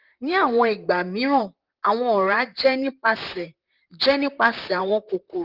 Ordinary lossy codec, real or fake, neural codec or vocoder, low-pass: Opus, 32 kbps; fake; vocoder, 44.1 kHz, 128 mel bands, Pupu-Vocoder; 5.4 kHz